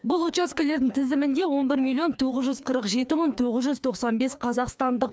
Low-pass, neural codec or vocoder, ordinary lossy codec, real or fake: none; codec, 16 kHz, 2 kbps, FreqCodec, larger model; none; fake